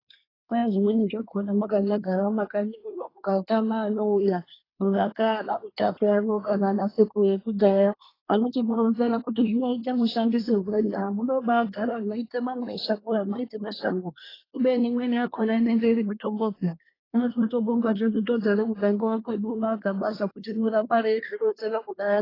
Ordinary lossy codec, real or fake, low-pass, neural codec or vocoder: AAC, 24 kbps; fake; 5.4 kHz; codec, 24 kHz, 1 kbps, SNAC